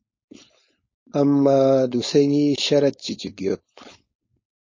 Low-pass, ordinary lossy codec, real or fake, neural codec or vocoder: 7.2 kHz; MP3, 32 kbps; fake; codec, 16 kHz, 4.8 kbps, FACodec